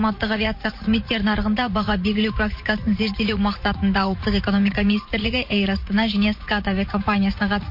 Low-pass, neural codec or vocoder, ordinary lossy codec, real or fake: 5.4 kHz; vocoder, 44.1 kHz, 80 mel bands, Vocos; MP3, 32 kbps; fake